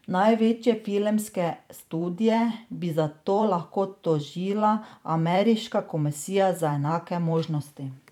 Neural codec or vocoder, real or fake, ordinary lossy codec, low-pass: vocoder, 44.1 kHz, 128 mel bands every 512 samples, BigVGAN v2; fake; none; 19.8 kHz